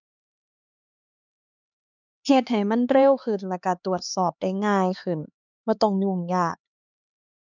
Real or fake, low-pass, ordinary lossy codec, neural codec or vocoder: fake; 7.2 kHz; none; codec, 16 kHz, 4 kbps, X-Codec, HuBERT features, trained on LibriSpeech